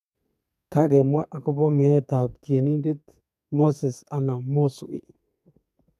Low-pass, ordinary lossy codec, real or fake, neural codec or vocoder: 14.4 kHz; none; fake; codec, 32 kHz, 1.9 kbps, SNAC